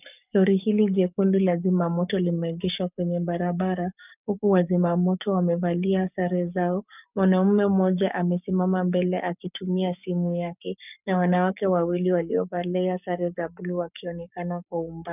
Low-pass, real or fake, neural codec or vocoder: 3.6 kHz; fake; codec, 44.1 kHz, 7.8 kbps, Pupu-Codec